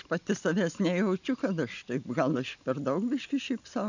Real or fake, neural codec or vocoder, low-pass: real; none; 7.2 kHz